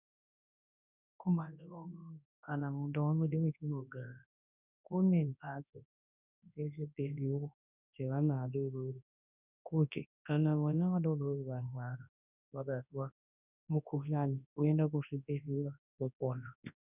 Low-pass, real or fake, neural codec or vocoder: 3.6 kHz; fake; codec, 24 kHz, 0.9 kbps, WavTokenizer, large speech release